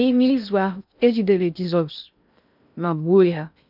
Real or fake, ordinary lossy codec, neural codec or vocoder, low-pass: fake; none; codec, 16 kHz in and 24 kHz out, 0.6 kbps, FocalCodec, streaming, 2048 codes; 5.4 kHz